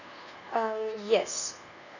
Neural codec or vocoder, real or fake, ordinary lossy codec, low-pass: codec, 24 kHz, 1.2 kbps, DualCodec; fake; none; 7.2 kHz